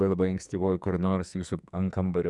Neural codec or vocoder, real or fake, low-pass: codec, 44.1 kHz, 2.6 kbps, SNAC; fake; 10.8 kHz